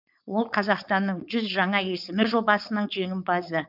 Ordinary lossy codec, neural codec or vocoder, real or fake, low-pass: none; codec, 16 kHz, 4.8 kbps, FACodec; fake; 5.4 kHz